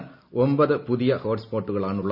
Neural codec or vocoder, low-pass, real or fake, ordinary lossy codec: none; 5.4 kHz; real; none